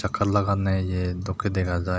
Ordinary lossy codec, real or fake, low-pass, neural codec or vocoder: none; real; none; none